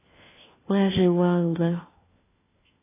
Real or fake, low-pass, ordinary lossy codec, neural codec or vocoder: fake; 3.6 kHz; AAC, 16 kbps; codec, 16 kHz, 1 kbps, FunCodec, trained on LibriTTS, 50 frames a second